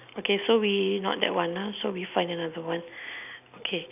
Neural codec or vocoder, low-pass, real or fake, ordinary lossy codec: none; 3.6 kHz; real; none